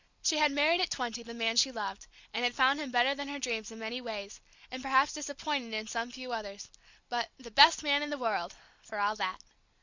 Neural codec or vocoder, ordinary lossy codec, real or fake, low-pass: none; Opus, 64 kbps; real; 7.2 kHz